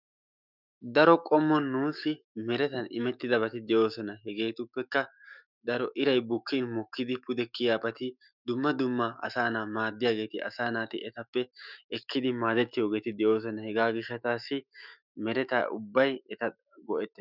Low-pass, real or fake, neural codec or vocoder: 5.4 kHz; fake; autoencoder, 48 kHz, 128 numbers a frame, DAC-VAE, trained on Japanese speech